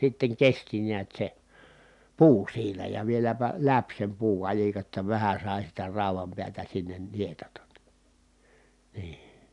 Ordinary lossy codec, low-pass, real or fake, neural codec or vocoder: none; 10.8 kHz; real; none